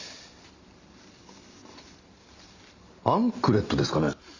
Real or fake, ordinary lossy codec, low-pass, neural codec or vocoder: real; Opus, 64 kbps; 7.2 kHz; none